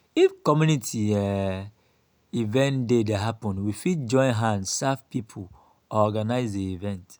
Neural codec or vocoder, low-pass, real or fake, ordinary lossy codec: none; none; real; none